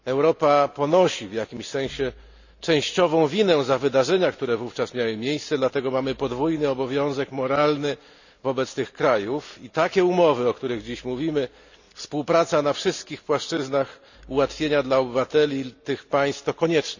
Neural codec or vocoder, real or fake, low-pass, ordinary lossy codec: none; real; 7.2 kHz; none